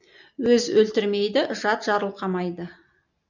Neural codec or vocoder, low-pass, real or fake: none; 7.2 kHz; real